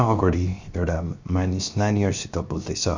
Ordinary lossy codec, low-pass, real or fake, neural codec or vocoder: Opus, 64 kbps; 7.2 kHz; fake; codec, 16 kHz, 0.7 kbps, FocalCodec